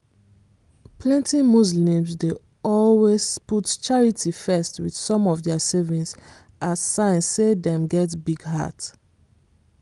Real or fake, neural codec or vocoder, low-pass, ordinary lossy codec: real; none; 10.8 kHz; Opus, 32 kbps